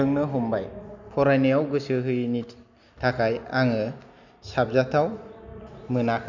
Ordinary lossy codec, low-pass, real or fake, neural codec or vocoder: none; 7.2 kHz; real; none